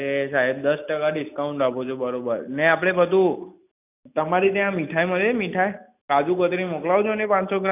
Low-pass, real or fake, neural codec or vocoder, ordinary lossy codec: 3.6 kHz; real; none; none